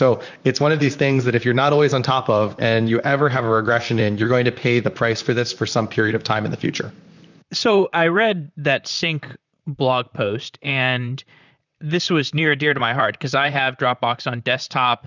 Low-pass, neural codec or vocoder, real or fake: 7.2 kHz; vocoder, 44.1 kHz, 128 mel bands, Pupu-Vocoder; fake